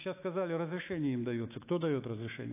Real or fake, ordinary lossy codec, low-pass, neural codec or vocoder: fake; AAC, 24 kbps; 3.6 kHz; autoencoder, 48 kHz, 128 numbers a frame, DAC-VAE, trained on Japanese speech